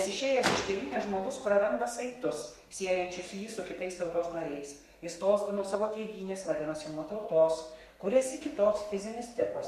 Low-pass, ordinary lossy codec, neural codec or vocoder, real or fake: 14.4 kHz; MP3, 64 kbps; codec, 32 kHz, 1.9 kbps, SNAC; fake